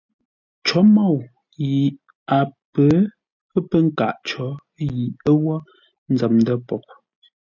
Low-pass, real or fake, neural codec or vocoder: 7.2 kHz; real; none